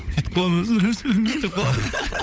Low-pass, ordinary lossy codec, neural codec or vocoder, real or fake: none; none; codec, 16 kHz, 16 kbps, FunCodec, trained on LibriTTS, 50 frames a second; fake